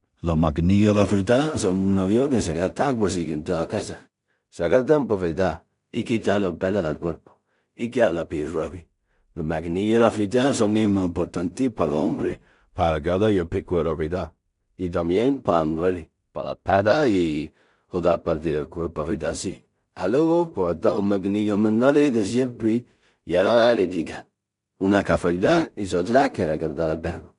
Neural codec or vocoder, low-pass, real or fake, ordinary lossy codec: codec, 16 kHz in and 24 kHz out, 0.4 kbps, LongCat-Audio-Codec, two codebook decoder; 10.8 kHz; fake; MP3, 96 kbps